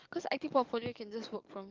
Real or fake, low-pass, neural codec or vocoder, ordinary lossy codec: fake; 7.2 kHz; codec, 44.1 kHz, 7.8 kbps, DAC; Opus, 32 kbps